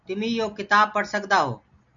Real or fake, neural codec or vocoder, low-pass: real; none; 7.2 kHz